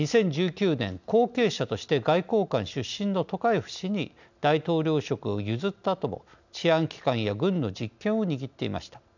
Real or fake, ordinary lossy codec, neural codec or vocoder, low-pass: real; none; none; 7.2 kHz